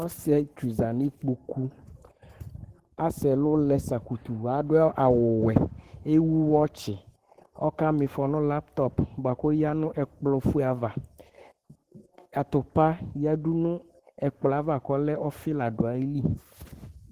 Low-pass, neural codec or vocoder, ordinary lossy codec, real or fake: 14.4 kHz; codec, 44.1 kHz, 7.8 kbps, Pupu-Codec; Opus, 16 kbps; fake